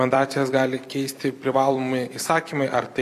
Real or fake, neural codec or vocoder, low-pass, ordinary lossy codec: fake; vocoder, 44.1 kHz, 128 mel bands every 256 samples, BigVGAN v2; 14.4 kHz; MP3, 96 kbps